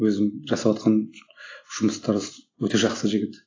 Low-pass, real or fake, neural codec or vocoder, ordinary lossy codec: 7.2 kHz; real; none; AAC, 32 kbps